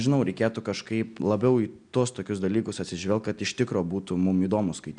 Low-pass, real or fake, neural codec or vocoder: 9.9 kHz; real; none